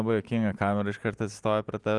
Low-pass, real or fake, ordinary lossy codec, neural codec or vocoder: 10.8 kHz; real; Opus, 24 kbps; none